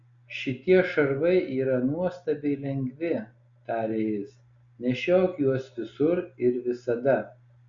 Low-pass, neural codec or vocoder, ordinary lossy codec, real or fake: 7.2 kHz; none; Opus, 64 kbps; real